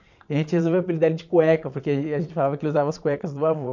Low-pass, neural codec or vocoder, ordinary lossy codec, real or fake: 7.2 kHz; vocoder, 22.05 kHz, 80 mel bands, Vocos; none; fake